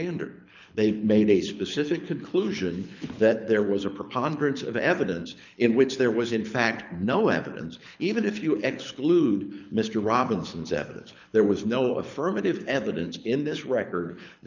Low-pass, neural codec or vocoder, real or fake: 7.2 kHz; codec, 24 kHz, 6 kbps, HILCodec; fake